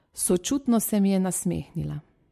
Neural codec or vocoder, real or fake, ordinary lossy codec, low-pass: none; real; MP3, 64 kbps; 14.4 kHz